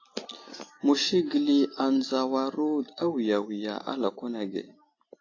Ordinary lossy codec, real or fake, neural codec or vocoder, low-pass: AAC, 32 kbps; real; none; 7.2 kHz